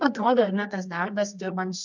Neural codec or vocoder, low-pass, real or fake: codec, 24 kHz, 0.9 kbps, WavTokenizer, medium music audio release; 7.2 kHz; fake